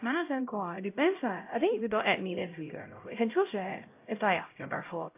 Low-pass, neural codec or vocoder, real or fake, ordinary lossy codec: 3.6 kHz; codec, 16 kHz, 0.5 kbps, X-Codec, HuBERT features, trained on LibriSpeech; fake; AAC, 24 kbps